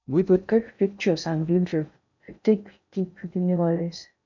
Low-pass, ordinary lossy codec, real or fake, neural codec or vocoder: 7.2 kHz; none; fake; codec, 16 kHz in and 24 kHz out, 0.6 kbps, FocalCodec, streaming, 2048 codes